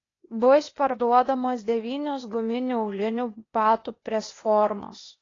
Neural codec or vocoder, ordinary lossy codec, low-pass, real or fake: codec, 16 kHz, 0.8 kbps, ZipCodec; AAC, 32 kbps; 7.2 kHz; fake